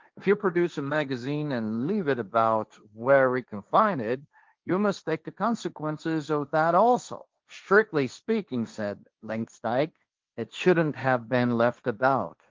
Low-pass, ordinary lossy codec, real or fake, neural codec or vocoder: 7.2 kHz; Opus, 32 kbps; fake; codec, 16 kHz, 1.1 kbps, Voila-Tokenizer